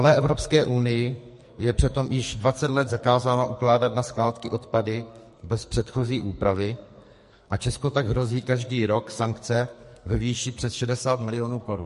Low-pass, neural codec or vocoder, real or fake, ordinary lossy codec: 14.4 kHz; codec, 44.1 kHz, 2.6 kbps, SNAC; fake; MP3, 48 kbps